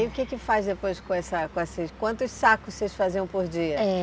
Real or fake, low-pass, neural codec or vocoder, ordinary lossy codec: real; none; none; none